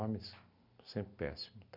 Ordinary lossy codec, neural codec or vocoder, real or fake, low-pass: none; none; real; 5.4 kHz